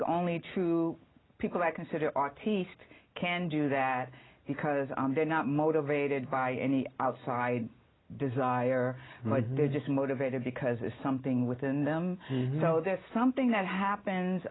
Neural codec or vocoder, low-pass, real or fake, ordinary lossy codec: none; 7.2 kHz; real; AAC, 16 kbps